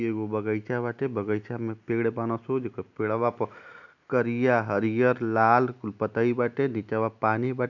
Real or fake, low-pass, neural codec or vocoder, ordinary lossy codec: real; 7.2 kHz; none; none